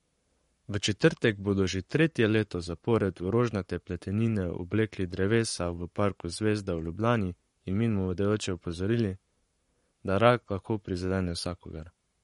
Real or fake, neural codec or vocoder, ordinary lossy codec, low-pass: fake; codec, 44.1 kHz, 7.8 kbps, Pupu-Codec; MP3, 48 kbps; 19.8 kHz